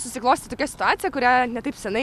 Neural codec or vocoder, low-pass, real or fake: none; 14.4 kHz; real